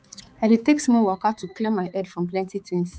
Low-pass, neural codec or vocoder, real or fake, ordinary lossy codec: none; codec, 16 kHz, 4 kbps, X-Codec, HuBERT features, trained on balanced general audio; fake; none